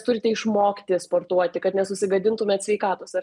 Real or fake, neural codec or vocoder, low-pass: real; none; 10.8 kHz